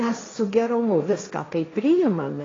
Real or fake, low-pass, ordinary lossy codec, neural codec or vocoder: fake; 7.2 kHz; AAC, 32 kbps; codec, 16 kHz, 1.1 kbps, Voila-Tokenizer